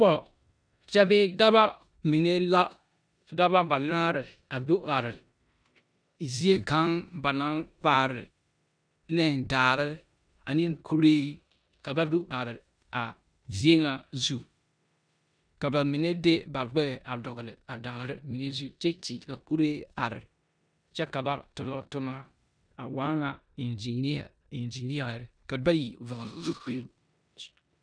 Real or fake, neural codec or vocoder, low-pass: fake; codec, 16 kHz in and 24 kHz out, 0.9 kbps, LongCat-Audio-Codec, four codebook decoder; 9.9 kHz